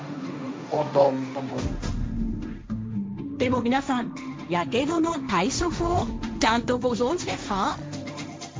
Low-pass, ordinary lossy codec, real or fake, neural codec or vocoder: none; none; fake; codec, 16 kHz, 1.1 kbps, Voila-Tokenizer